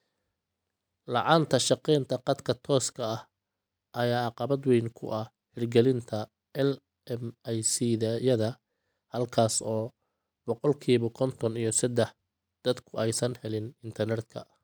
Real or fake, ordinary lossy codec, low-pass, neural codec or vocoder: real; none; none; none